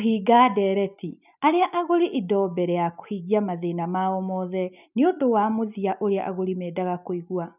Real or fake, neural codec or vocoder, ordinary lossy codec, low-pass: real; none; none; 3.6 kHz